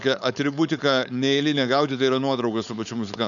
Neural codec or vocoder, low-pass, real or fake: codec, 16 kHz, 4.8 kbps, FACodec; 7.2 kHz; fake